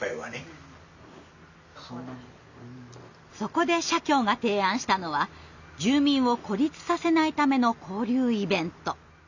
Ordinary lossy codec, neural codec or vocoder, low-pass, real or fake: none; none; 7.2 kHz; real